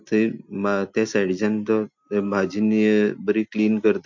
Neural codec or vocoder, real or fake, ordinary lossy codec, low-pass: none; real; MP3, 48 kbps; 7.2 kHz